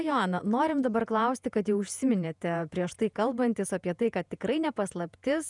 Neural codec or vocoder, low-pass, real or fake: vocoder, 48 kHz, 128 mel bands, Vocos; 10.8 kHz; fake